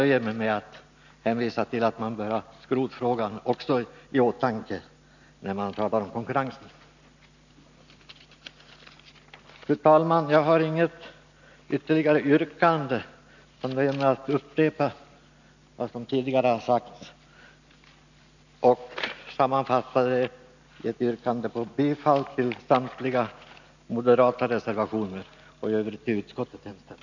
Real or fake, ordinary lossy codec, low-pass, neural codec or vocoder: real; none; 7.2 kHz; none